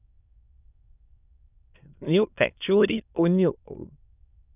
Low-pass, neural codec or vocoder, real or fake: 3.6 kHz; autoencoder, 22.05 kHz, a latent of 192 numbers a frame, VITS, trained on many speakers; fake